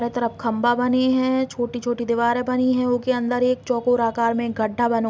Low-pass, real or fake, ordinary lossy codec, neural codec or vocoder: none; real; none; none